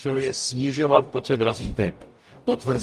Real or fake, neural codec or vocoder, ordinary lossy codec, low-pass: fake; codec, 44.1 kHz, 0.9 kbps, DAC; Opus, 32 kbps; 14.4 kHz